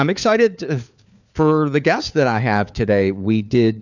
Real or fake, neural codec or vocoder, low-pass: fake; codec, 16 kHz, 4 kbps, FunCodec, trained on LibriTTS, 50 frames a second; 7.2 kHz